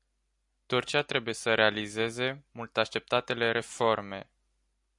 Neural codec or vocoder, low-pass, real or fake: none; 10.8 kHz; real